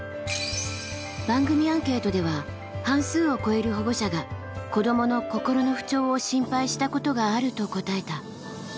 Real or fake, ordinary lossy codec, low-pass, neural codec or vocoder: real; none; none; none